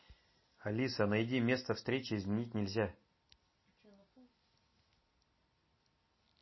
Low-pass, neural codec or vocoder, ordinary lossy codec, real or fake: 7.2 kHz; none; MP3, 24 kbps; real